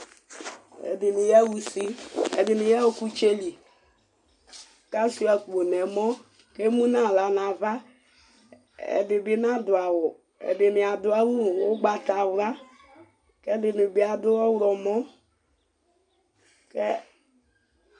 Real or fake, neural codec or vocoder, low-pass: real; none; 10.8 kHz